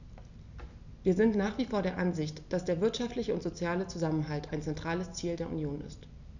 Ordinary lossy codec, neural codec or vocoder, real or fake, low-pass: none; none; real; 7.2 kHz